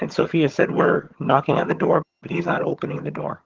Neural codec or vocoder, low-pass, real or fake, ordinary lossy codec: vocoder, 22.05 kHz, 80 mel bands, HiFi-GAN; 7.2 kHz; fake; Opus, 16 kbps